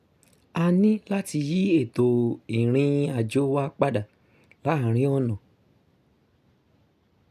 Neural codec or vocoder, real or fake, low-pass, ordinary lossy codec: none; real; 14.4 kHz; none